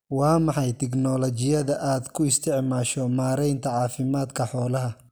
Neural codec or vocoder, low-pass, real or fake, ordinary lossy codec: none; none; real; none